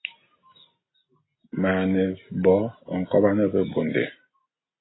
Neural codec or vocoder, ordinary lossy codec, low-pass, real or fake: none; AAC, 16 kbps; 7.2 kHz; real